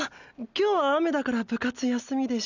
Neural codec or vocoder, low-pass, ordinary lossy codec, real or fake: none; 7.2 kHz; none; real